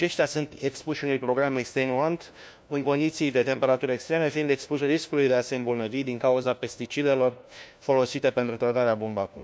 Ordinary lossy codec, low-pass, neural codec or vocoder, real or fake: none; none; codec, 16 kHz, 1 kbps, FunCodec, trained on LibriTTS, 50 frames a second; fake